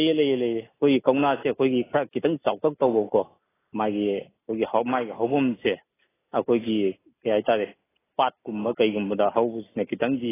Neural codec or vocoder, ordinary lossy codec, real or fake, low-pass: none; AAC, 16 kbps; real; 3.6 kHz